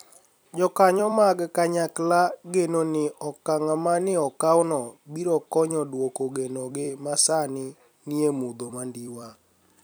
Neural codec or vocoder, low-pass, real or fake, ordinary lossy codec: vocoder, 44.1 kHz, 128 mel bands every 256 samples, BigVGAN v2; none; fake; none